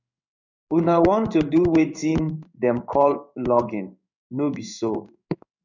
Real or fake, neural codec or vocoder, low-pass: fake; codec, 16 kHz in and 24 kHz out, 1 kbps, XY-Tokenizer; 7.2 kHz